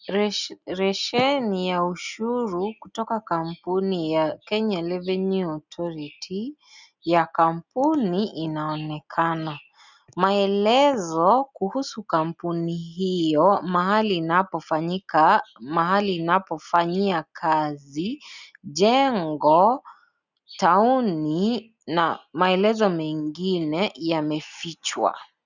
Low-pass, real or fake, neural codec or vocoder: 7.2 kHz; real; none